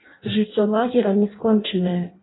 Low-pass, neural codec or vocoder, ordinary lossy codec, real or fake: 7.2 kHz; codec, 16 kHz in and 24 kHz out, 0.6 kbps, FireRedTTS-2 codec; AAC, 16 kbps; fake